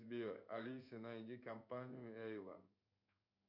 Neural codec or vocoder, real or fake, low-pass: codec, 16 kHz in and 24 kHz out, 1 kbps, XY-Tokenizer; fake; 5.4 kHz